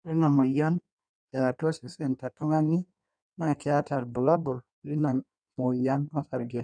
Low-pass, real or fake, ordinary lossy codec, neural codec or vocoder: 9.9 kHz; fake; none; codec, 16 kHz in and 24 kHz out, 1.1 kbps, FireRedTTS-2 codec